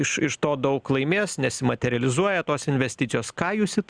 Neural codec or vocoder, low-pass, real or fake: none; 9.9 kHz; real